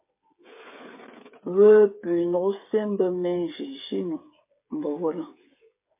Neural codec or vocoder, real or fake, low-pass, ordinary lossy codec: codec, 16 kHz, 8 kbps, FreqCodec, smaller model; fake; 3.6 kHz; MP3, 24 kbps